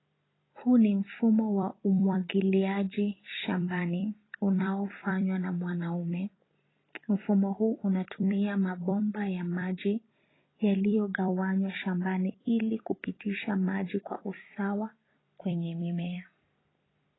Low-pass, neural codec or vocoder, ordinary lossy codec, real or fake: 7.2 kHz; vocoder, 44.1 kHz, 128 mel bands every 256 samples, BigVGAN v2; AAC, 16 kbps; fake